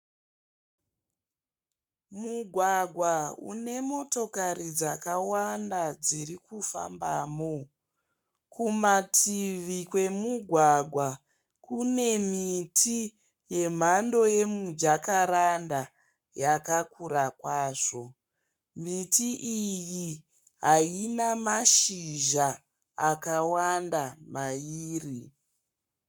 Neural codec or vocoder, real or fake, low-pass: codec, 44.1 kHz, 7.8 kbps, Pupu-Codec; fake; 19.8 kHz